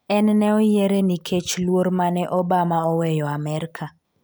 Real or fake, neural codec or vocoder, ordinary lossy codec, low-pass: real; none; none; none